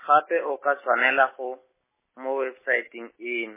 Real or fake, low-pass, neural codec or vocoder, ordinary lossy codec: real; 3.6 kHz; none; MP3, 16 kbps